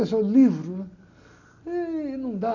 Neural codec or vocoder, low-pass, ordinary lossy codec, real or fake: none; 7.2 kHz; none; real